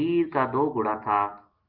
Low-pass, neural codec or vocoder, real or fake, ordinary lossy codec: 5.4 kHz; none; real; Opus, 32 kbps